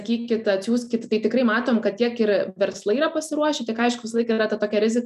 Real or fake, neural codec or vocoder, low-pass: real; none; 14.4 kHz